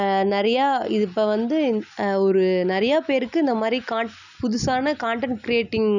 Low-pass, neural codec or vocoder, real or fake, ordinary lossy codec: 7.2 kHz; none; real; none